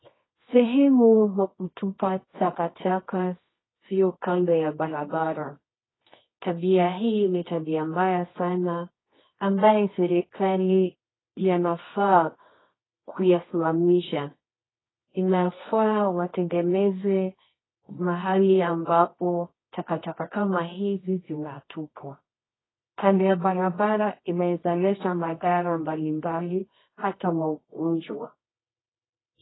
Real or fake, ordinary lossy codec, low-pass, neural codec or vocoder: fake; AAC, 16 kbps; 7.2 kHz; codec, 24 kHz, 0.9 kbps, WavTokenizer, medium music audio release